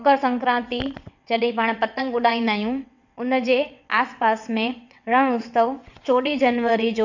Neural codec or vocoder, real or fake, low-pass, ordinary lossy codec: vocoder, 22.05 kHz, 80 mel bands, WaveNeXt; fake; 7.2 kHz; none